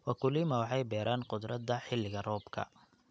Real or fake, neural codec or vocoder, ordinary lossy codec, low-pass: real; none; none; none